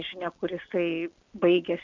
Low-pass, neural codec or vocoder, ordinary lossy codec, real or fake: 7.2 kHz; none; MP3, 64 kbps; real